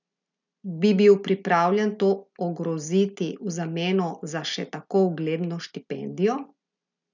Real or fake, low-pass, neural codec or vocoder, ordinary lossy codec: real; 7.2 kHz; none; none